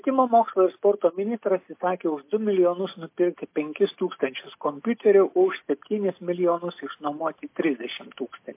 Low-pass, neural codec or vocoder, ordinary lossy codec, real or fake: 3.6 kHz; none; MP3, 24 kbps; real